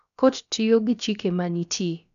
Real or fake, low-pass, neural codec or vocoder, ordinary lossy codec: fake; 7.2 kHz; codec, 16 kHz, about 1 kbps, DyCAST, with the encoder's durations; none